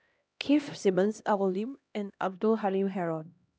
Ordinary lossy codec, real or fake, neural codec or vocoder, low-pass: none; fake; codec, 16 kHz, 1 kbps, X-Codec, HuBERT features, trained on LibriSpeech; none